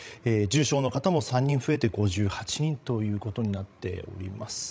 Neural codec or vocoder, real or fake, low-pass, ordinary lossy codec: codec, 16 kHz, 16 kbps, FreqCodec, larger model; fake; none; none